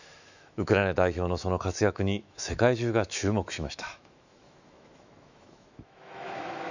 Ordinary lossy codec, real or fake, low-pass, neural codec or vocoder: none; fake; 7.2 kHz; autoencoder, 48 kHz, 128 numbers a frame, DAC-VAE, trained on Japanese speech